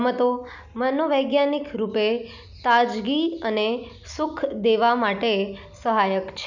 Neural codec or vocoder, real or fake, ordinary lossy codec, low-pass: none; real; none; 7.2 kHz